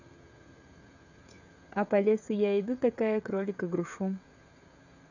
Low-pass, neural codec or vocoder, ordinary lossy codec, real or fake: 7.2 kHz; codec, 16 kHz, 16 kbps, FreqCodec, smaller model; none; fake